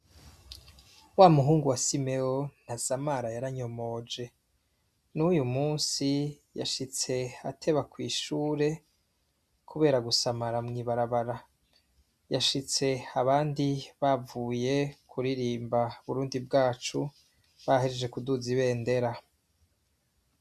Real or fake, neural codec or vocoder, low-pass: real; none; 14.4 kHz